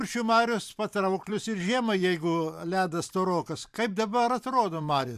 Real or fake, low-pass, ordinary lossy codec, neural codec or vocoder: fake; 14.4 kHz; AAC, 96 kbps; vocoder, 44.1 kHz, 128 mel bands every 512 samples, BigVGAN v2